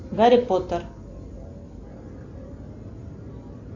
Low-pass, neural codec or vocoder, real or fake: 7.2 kHz; none; real